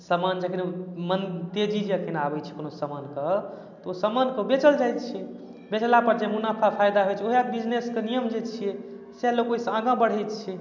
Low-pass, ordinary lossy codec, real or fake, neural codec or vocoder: 7.2 kHz; none; real; none